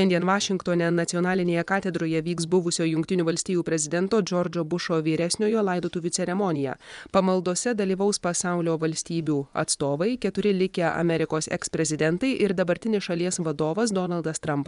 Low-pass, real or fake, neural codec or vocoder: 10.8 kHz; fake; vocoder, 24 kHz, 100 mel bands, Vocos